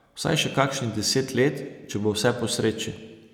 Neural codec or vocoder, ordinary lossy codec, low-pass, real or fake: none; none; 19.8 kHz; real